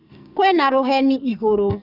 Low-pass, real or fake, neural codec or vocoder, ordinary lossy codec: 5.4 kHz; fake; codec, 44.1 kHz, 2.6 kbps, SNAC; none